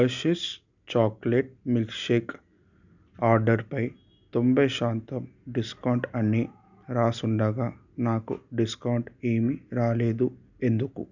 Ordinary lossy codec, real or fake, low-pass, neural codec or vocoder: none; real; 7.2 kHz; none